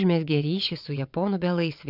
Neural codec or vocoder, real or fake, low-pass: vocoder, 22.05 kHz, 80 mel bands, WaveNeXt; fake; 5.4 kHz